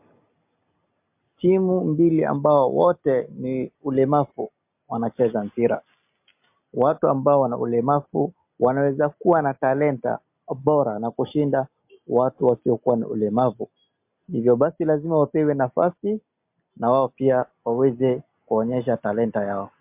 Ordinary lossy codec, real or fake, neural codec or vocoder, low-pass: MP3, 32 kbps; real; none; 3.6 kHz